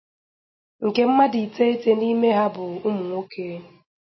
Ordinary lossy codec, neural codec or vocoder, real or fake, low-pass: MP3, 24 kbps; none; real; 7.2 kHz